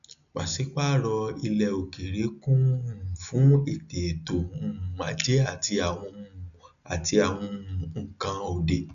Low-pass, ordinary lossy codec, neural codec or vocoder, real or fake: 7.2 kHz; none; none; real